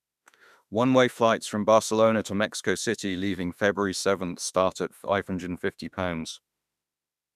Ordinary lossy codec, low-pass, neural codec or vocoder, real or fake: none; 14.4 kHz; autoencoder, 48 kHz, 32 numbers a frame, DAC-VAE, trained on Japanese speech; fake